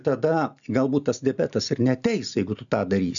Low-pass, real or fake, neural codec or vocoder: 7.2 kHz; real; none